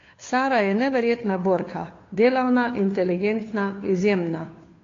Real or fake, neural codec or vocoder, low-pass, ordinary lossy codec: fake; codec, 16 kHz, 2 kbps, FunCodec, trained on Chinese and English, 25 frames a second; 7.2 kHz; AAC, 32 kbps